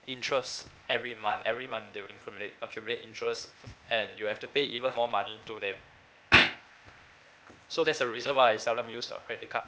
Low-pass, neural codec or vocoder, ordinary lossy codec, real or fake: none; codec, 16 kHz, 0.8 kbps, ZipCodec; none; fake